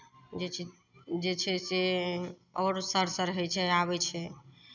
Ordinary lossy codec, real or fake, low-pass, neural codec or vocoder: none; real; 7.2 kHz; none